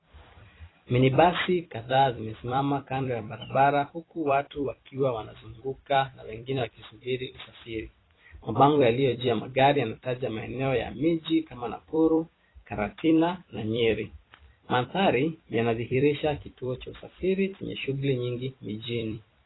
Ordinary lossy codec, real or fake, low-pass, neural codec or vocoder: AAC, 16 kbps; fake; 7.2 kHz; vocoder, 44.1 kHz, 128 mel bands every 256 samples, BigVGAN v2